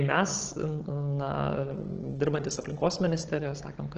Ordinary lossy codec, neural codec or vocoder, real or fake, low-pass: Opus, 16 kbps; codec, 16 kHz, 16 kbps, FunCodec, trained on Chinese and English, 50 frames a second; fake; 7.2 kHz